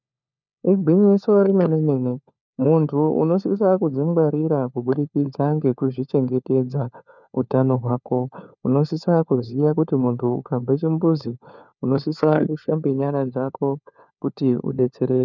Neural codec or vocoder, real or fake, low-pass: codec, 16 kHz, 4 kbps, FunCodec, trained on LibriTTS, 50 frames a second; fake; 7.2 kHz